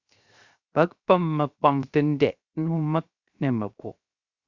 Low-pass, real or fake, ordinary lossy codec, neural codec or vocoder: 7.2 kHz; fake; Opus, 64 kbps; codec, 16 kHz, 0.7 kbps, FocalCodec